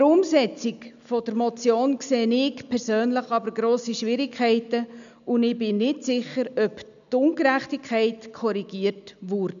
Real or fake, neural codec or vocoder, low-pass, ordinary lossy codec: real; none; 7.2 kHz; none